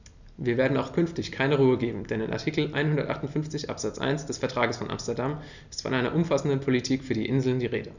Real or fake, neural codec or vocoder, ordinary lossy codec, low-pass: real; none; none; 7.2 kHz